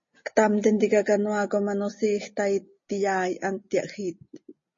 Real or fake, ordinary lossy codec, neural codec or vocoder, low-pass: real; MP3, 32 kbps; none; 7.2 kHz